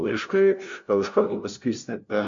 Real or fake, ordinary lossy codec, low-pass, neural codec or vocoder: fake; MP3, 48 kbps; 7.2 kHz; codec, 16 kHz, 0.5 kbps, FunCodec, trained on LibriTTS, 25 frames a second